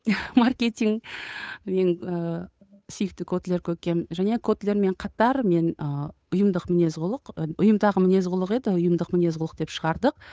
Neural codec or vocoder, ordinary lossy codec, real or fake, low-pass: codec, 16 kHz, 8 kbps, FunCodec, trained on Chinese and English, 25 frames a second; none; fake; none